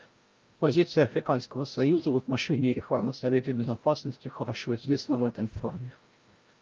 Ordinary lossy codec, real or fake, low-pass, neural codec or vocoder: Opus, 24 kbps; fake; 7.2 kHz; codec, 16 kHz, 0.5 kbps, FreqCodec, larger model